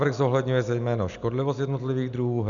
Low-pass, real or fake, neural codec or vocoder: 7.2 kHz; real; none